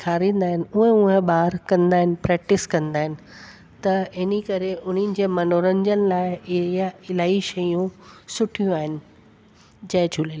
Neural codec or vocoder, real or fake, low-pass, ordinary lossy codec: none; real; none; none